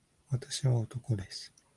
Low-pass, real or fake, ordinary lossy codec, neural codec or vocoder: 10.8 kHz; real; Opus, 24 kbps; none